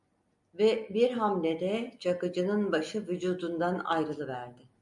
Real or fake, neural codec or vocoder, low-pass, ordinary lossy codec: real; none; 10.8 kHz; MP3, 96 kbps